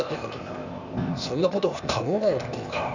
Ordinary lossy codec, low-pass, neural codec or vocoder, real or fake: none; 7.2 kHz; codec, 16 kHz, 0.8 kbps, ZipCodec; fake